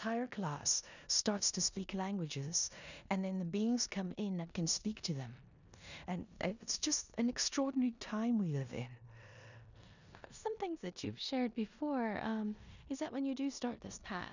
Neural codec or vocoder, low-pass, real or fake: codec, 16 kHz in and 24 kHz out, 0.9 kbps, LongCat-Audio-Codec, four codebook decoder; 7.2 kHz; fake